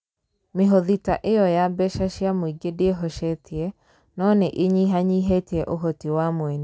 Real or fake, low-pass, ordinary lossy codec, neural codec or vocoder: real; none; none; none